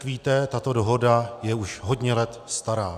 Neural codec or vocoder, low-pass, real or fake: none; 14.4 kHz; real